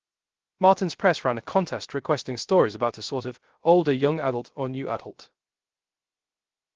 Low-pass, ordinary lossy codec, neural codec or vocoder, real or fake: 7.2 kHz; Opus, 16 kbps; codec, 16 kHz, 0.3 kbps, FocalCodec; fake